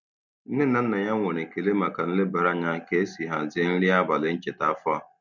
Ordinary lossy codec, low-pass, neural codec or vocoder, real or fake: none; 7.2 kHz; none; real